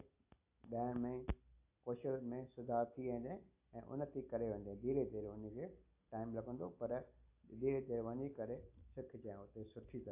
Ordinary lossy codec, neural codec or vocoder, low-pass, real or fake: AAC, 32 kbps; none; 3.6 kHz; real